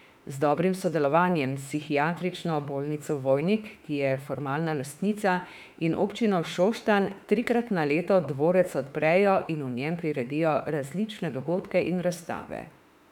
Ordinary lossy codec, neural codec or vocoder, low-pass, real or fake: none; autoencoder, 48 kHz, 32 numbers a frame, DAC-VAE, trained on Japanese speech; 19.8 kHz; fake